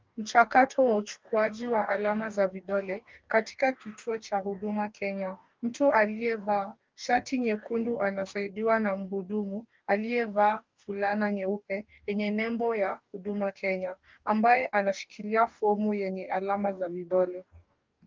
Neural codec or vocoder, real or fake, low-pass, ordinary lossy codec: codec, 44.1 kHz, 2.6 kbps, DAC; fake; 7.2 kHz; Opus, 32 kbps